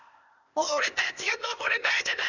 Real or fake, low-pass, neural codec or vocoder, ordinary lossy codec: fake; 7.2 kHz; codec, 16 kHz, 0.8 kbps, ZipCodec; none